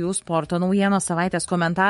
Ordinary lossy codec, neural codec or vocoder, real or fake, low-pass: MP3, 48 kbps; autoencoder, 48 kHz, 128 numbers a frame, DAC-VAE, trained on Japanese speech; fake; 19.8 kHz